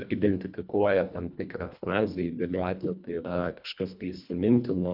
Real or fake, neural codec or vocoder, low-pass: fake; codec, 24 kHz, 1.5 kbps, HILCodec; 5.4 kHz